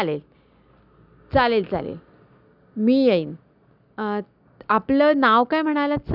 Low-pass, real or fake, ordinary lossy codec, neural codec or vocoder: 5.4 kHz; real; none; none